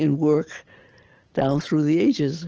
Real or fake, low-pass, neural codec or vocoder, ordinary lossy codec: real; 7.2 kHz; none; Opus, 16 kbps